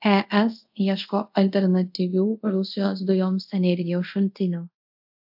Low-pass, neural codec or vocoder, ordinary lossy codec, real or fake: 5.4 kHz; codec, 24 kHz, 0.5 kbps, DualCodec; AAC, 48 kbps; fake